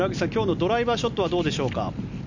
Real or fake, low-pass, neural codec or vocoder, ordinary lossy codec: real; 7.2 kHz; none; none